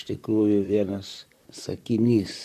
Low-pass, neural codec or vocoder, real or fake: 14.4 kHz; vocoder, 44.1 kHz, 128 mel bands, Pupu-Vocoder; fake